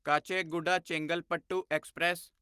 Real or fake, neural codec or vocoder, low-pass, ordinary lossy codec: real; none; 14.4 kHz; Opus, 24 kbps